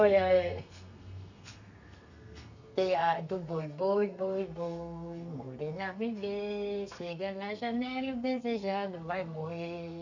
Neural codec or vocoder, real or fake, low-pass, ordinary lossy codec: codec, 32 kHz, 1.9 kbps, SNAC; fake; 7.2 kHz; none